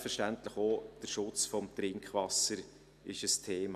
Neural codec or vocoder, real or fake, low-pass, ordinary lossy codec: none; real; 14.4 kHz; none